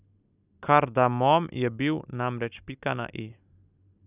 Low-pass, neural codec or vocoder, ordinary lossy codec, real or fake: 3.6 kHz; none; none; real